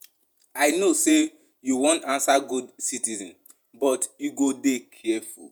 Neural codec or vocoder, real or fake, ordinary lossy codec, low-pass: vocoder, 48 kHz, 128 mel bands, Vocos; fake; none; none